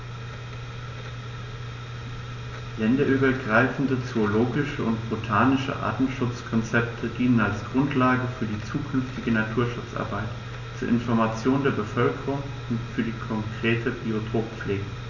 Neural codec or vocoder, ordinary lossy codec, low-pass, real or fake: none; none; 7.2 kHz; real